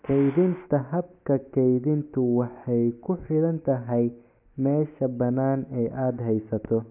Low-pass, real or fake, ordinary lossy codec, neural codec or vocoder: 3.6 kHz; real; none; none